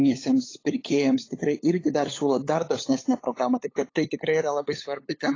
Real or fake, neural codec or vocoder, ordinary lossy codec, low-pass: fake; codec, 16 kHz, 8 kbps, FunCodec, trained on LibriTTS, 25 frames a second; AAC, 32 kbps; 7.2 kHz